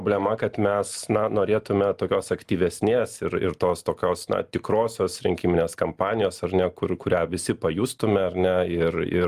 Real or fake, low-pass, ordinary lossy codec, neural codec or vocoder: real; 14.4 kHz; Opus, 32 kbps; none